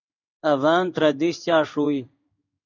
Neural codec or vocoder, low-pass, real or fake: codec, 16 kHz in and 24 kHz out, 1 kbps, XY-Tokenizer; 7.2 kHz; fake